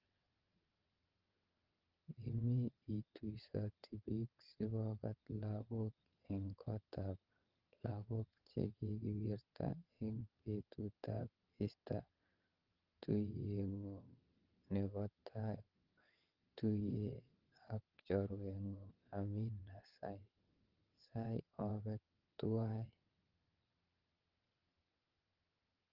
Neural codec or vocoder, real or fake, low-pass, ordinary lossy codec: vocoder, 22.05 kHz, 80 mel bands, WaveNeXt; fake; 5.4 kHz; Opus, 32 kbps